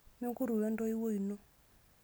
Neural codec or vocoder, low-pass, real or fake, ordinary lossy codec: none; none; real; none